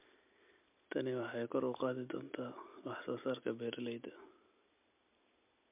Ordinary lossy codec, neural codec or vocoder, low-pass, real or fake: MP3, 32 kbps; none; 3.6 kHz; real